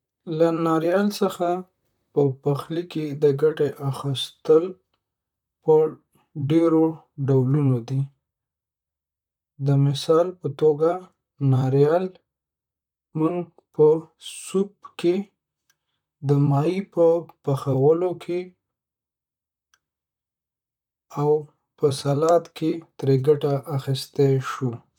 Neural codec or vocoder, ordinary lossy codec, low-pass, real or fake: vocoder, 44.1 kHz, 128 mel bands, Pupu-Vocoder; none; 19.8 kHz; fake